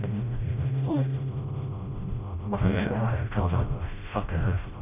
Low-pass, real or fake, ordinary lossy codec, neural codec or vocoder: 3.6 kHz; fake; none; codec, 16 kHz, 0.5 kbps, FreqCodec, smaller model